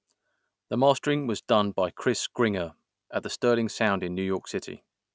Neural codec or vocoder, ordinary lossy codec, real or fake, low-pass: none; none; real; none